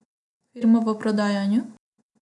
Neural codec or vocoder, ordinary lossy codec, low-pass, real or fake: none; none; 10.8 kHz; real